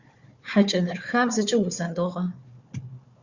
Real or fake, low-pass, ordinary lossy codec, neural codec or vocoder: fake; 7.2 kHz; Opus, 64 kbps; codec, 16 kHz, 4 kbps, FunCodec, trained on Chinese and English, 50 frames a second